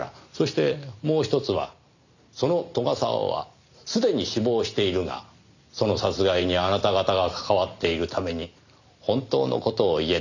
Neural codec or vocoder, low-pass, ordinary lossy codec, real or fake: none; 7.2 kHz; none; real